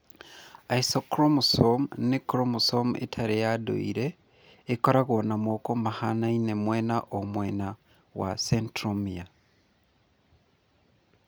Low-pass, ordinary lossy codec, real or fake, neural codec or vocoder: none; none; real; none